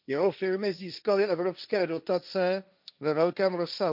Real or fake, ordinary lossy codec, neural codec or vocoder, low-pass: fake; none; codec, 16 kHz, 1.1 kbps, Voila-Tokenizer; 5.4 kHz